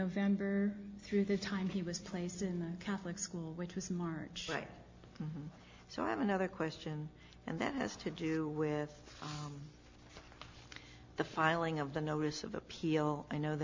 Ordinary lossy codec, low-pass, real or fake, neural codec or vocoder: MP3, 48 kbps; 7.2 kHz; real; none